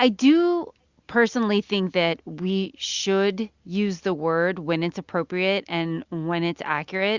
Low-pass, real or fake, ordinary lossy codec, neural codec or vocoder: 7.2 kHz; real; Opus, 64 kbps; none